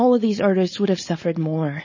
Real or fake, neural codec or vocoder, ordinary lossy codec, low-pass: fake; codec, 16 kHz, 4.8 kbps, FACodec; MP3, 32 kbps; 7.2 kHz